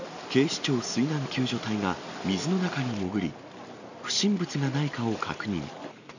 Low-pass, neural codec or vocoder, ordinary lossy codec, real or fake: 7.2 kHz; none; none; real